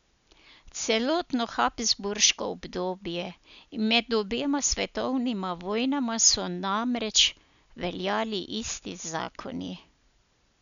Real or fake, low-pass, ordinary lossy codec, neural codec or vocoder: real; 7.2 kHz; none; none